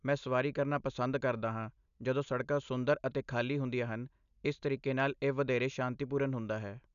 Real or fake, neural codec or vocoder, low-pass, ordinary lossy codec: real; none; 7.2 kHz; none